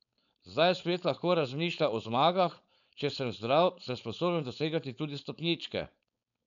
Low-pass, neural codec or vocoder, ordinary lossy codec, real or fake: 7.2 kHz; codec, 16 kHz, 4.8 kbps, FACodec; none; fake